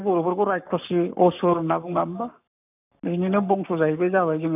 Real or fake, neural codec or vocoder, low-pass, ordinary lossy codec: fake; codec, 44.1 kHz, 7.8 kbps, Pupu-Codec; 3.6 kHz; none